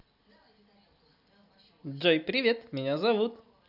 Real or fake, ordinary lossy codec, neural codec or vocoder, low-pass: real; none; none; 5.4 kHz